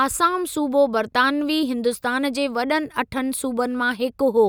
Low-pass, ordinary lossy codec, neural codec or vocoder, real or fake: none; none; none; real